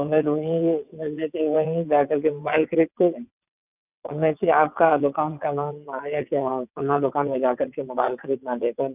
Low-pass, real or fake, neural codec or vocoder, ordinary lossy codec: 3.6 kHz; fake; vocoder, 22.05 kHz, 80 mel bands, WaveNeXt; none